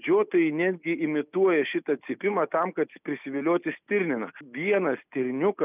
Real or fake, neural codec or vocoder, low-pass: real; none; 3.6 kHz